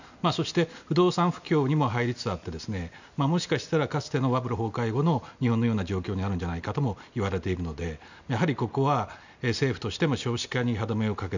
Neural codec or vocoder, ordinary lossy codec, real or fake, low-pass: none; none; real; 7.2 kHz